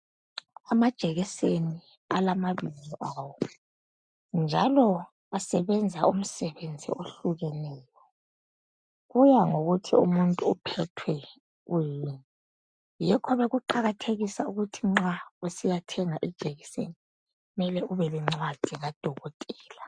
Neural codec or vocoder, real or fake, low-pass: none; real; 9.9 kHz